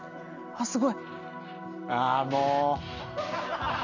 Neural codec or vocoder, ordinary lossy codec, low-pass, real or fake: none; none; 7.2 kHz; real